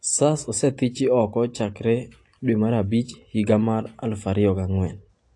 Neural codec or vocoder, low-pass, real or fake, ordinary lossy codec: none; 10.8 kHz; real; AAC, 48 kbps